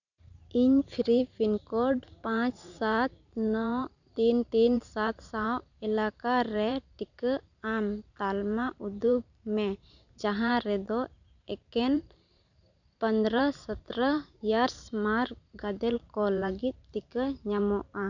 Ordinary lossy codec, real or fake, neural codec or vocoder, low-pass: none; fake; vocoder, 44.1 kHz, 128 mel bands every 512 samples, BigVGAN v2; 7.2 kHz